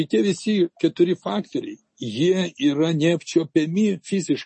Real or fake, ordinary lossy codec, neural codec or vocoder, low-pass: real; MP3, 32 kbps; none; 10.8 kHz